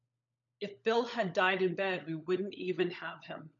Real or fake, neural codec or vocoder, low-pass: fake; codec, 16 kHz, 16 kbps, FunCodec, trained on LibriTTS, 50 frames a second; 7.2 kHz